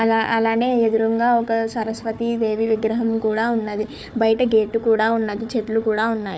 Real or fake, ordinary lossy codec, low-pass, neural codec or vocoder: fake; none; none; codec, 16 kHz, 4 kbps, FunCodec, trained on Chinese and English, 50 frames a second